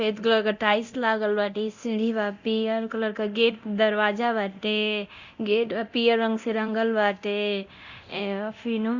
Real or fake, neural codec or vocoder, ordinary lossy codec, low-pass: fake; codec, 24 kHz, 0.9 kbps, DualCodec; Opus, 64 kbps; 7.2 kHz